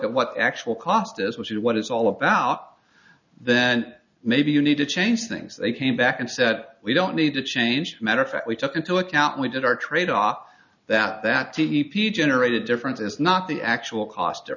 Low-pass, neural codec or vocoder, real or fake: 7.2 kHz; none; real